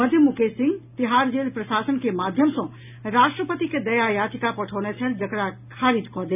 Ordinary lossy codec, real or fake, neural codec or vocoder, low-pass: none; real; none; 3.6 kHz